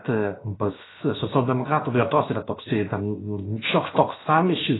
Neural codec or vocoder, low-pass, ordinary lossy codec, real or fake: codec, 16 kHz, 0.7 kbps, FocalCodec; 7.2 kHz; AAC, 16 kbps; fake